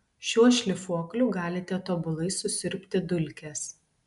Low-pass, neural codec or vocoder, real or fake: 10.8 kHz; none; real